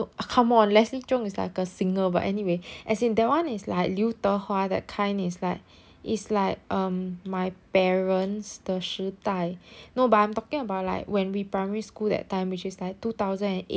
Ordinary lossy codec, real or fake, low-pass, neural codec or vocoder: none; real; none; none